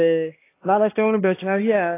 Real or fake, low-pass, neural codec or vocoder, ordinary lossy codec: fake; 3.6 kHz; codec, 16 kHz, 1 kbps, X-Codec, HuBERT features, trained on balanced general audio; AAC, 24 kbps